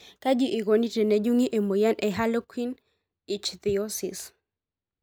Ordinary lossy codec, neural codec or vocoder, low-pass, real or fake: none; none; none; real